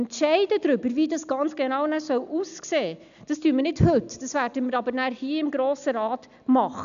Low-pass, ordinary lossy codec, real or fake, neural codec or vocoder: 7.2 kHz; none; real; none